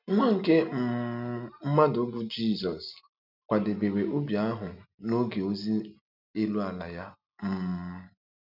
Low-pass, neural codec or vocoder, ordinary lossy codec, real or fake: 5.4 kHz; none; none; real